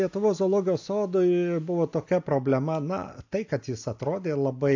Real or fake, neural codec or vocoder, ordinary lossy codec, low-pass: real; none; AAC, 48 kbps; 7.2 kHz